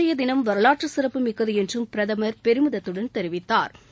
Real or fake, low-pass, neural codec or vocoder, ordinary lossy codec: real; none; none; none